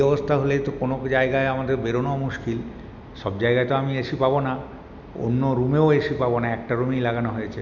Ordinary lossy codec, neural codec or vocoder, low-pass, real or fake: none; none; 7.2 kHz; real